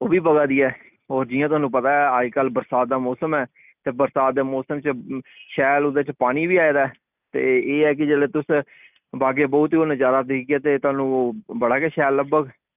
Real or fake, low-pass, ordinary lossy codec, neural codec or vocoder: real; 3.6 kHz; none; none